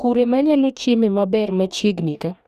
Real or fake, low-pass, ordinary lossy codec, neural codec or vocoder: fake; 14.4 kHz; none; codec, 44.1 kHz, 2.6 kbps, DAC